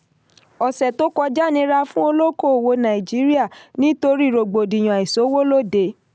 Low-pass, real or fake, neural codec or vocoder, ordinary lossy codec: none; real; none; none